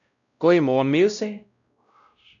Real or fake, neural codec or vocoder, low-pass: fake; codec, 16 kHz, 0.5 kbps, X-Codec, WavLM features, trained on Multilingual LibriSpeech; 7.2 kHz